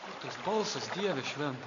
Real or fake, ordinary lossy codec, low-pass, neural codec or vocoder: real; AAC, 64 kbps; 7.2 kHz; none